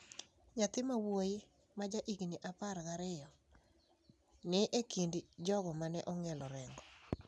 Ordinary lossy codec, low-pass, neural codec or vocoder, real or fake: none; none; none; real